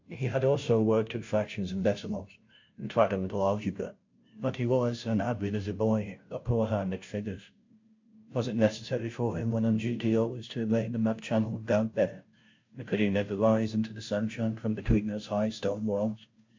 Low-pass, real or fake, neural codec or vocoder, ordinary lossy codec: 7.2 kHz; fake; codec, 16 kHz, 0.5 kbps, FunCodec, trained on Chinese and English, 25 frames a second; MP3, 48 kbps